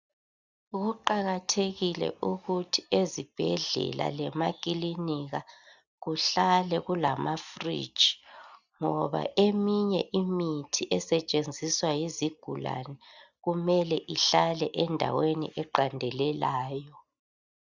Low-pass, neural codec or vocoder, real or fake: 7.2 kHz; none; real